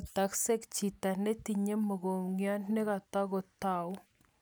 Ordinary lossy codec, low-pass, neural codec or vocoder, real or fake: none; none; none; real